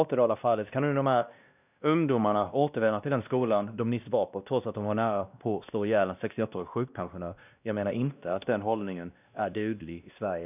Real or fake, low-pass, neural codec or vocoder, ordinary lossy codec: fake; 3.6 kHz; codec, 16 kHz, 1 kbps, X-Codec, WavLM features, trained on Multilingual LibriSpeech; none